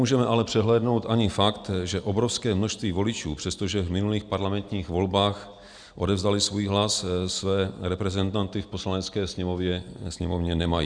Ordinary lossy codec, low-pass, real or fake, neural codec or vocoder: Opus, 64 kbps; 9.9 kHz; real; none